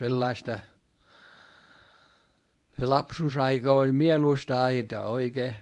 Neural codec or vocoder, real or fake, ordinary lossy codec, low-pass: codec, 24 kHz, 0.9 kbps, WavTokenizer, medium speech release version 1; fake; AAC, 64 kbps; 10.8 kHz